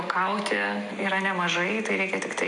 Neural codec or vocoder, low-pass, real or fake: none; 10.8 kHz; real